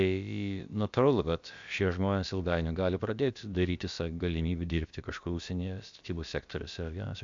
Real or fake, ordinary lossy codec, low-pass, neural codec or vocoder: fake; MP3, 64 kbps; 7.2 kHz; codec, 16 kHz, about 1 kbps, DyCAST, with the encoder's durations